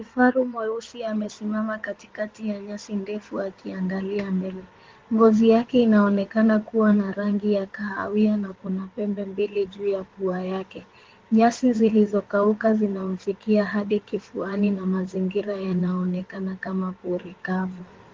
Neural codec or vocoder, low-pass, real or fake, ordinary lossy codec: codec, 16 kHz in and 24 kHz out, 2.2 kbps, FireRedTTS-2 codec; 7.2 kHz; fake; Opus, 16 kbps